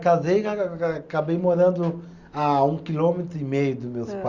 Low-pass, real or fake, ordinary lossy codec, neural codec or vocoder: 7.2 kHz; real; Opus, 64 kbps; none